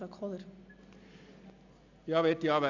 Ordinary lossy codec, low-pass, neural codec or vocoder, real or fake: none; 7.2 kHz; none; real